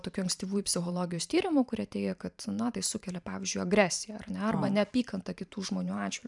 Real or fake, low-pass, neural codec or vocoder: fake; 10.8 kHz; vocoder, 44.1 kHz, 128 mel bands every 512 samples, BigVGAN v2